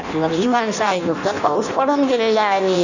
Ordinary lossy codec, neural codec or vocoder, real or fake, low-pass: none; codec, 16 kHz in and 24 kHz out, 0.6 kbps, FireRedTTS-2 codec; fake; 7.2 kHz